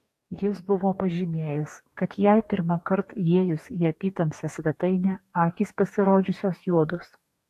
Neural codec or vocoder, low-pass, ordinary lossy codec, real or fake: codec, 44.1 kHz, 2.6 kbps, DAC; 14.4 kHz; AAC, 64 kbps; fake